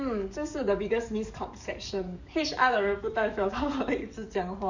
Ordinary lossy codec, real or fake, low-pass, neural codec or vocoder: none; fake; 7.2 kHz; codec, 44.1 kHz, 7.8 kbps, DAC